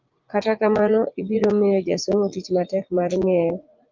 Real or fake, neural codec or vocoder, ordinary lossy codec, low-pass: fake; vocoder, 44.1 kHz, 80 mel bands, Vocos; Opus, 24 kbps; 7.2 kHz